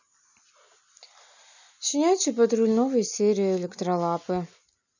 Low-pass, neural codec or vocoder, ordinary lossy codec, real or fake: 7.2 kHz; none; none; real